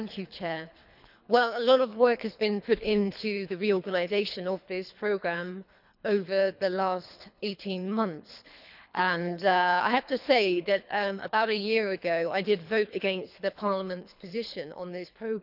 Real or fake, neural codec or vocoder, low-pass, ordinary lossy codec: fake; codec, 24 kHz, 3 kbps, HILCodec; 5.4 kHz; none